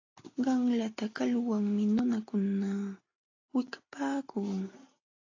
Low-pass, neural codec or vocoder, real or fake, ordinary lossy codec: 7.2 kHz; none; real; AAC, 32 kbps